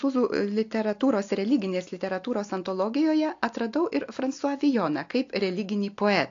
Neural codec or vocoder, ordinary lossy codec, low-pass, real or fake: none; AAC, 48 kbps; 7.2 kHz; real